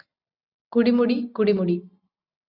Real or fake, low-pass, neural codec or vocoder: real; 5.4 kHz; none